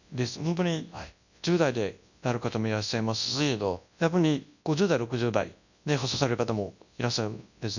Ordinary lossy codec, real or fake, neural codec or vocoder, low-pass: none; fake; codec, 24 kHz, 0.9 kbps, WavTokenizer, large speech release; 7.2 kHz